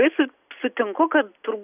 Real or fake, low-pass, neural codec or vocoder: real; 3.6 kHz; none